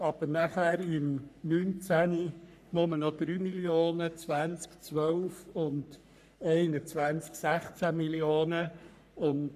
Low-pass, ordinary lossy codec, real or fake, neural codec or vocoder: 14.4 kHz; none; fake; codec, 44.1 kHz, 3.4 kbps, Pupu-Codec